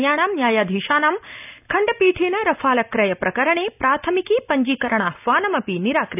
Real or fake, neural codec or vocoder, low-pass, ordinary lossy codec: real; none; 3.6 kHz; none